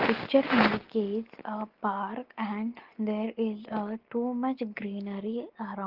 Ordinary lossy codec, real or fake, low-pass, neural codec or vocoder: Opus, 16 kbps; real; 5.4 kHz; none